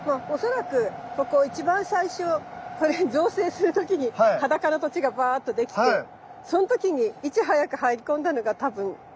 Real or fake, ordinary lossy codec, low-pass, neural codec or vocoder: real; none; none; none